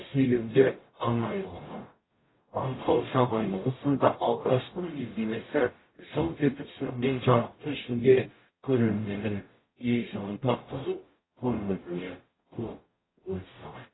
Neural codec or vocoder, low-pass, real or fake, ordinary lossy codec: codec, 44.1 kHz, 0.9 kbps, DAC; 7.2 kHz; fake; AAC, 16 kbps